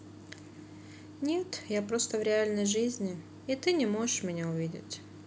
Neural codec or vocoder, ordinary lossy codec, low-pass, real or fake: none; none; none; real